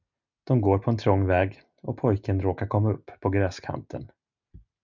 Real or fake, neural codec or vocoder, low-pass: real; none; 7.2 kHz